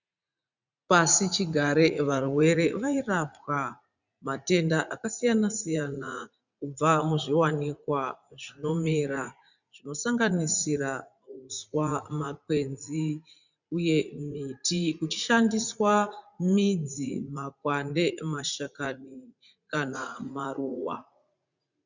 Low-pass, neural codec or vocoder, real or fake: 7.2 kHz; vocoder, 44.1 kHz, 80 mel bands, Vocos; fake